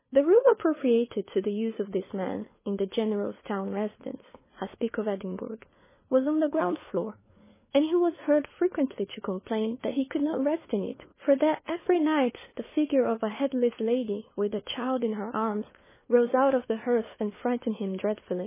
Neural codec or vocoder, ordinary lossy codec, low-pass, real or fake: codec, 16 kHz, 4 kbps, FunCodec, trained on LibriTTS, 50 frames a second; MP3, 16 kbps; 3.6 kHz; fake